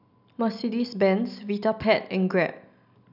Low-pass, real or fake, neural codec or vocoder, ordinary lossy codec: 5.4 kHz; real; none; none